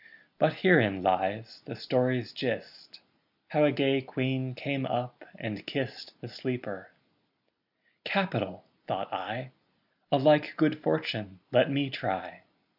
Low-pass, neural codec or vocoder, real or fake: 5.4 kHz; none; real